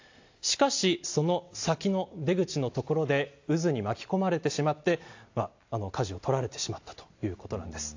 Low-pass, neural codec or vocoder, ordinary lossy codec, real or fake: 7.2 kHz; none; AAC, 48 kbps; real